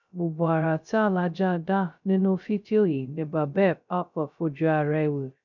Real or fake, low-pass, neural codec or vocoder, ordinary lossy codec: fake; 7.2 kHz; codec, 16 kHz, 0.2 kbps, FocalCodec; none